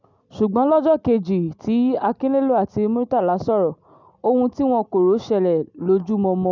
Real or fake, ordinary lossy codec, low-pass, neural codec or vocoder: real; none; 7.2 kHz; none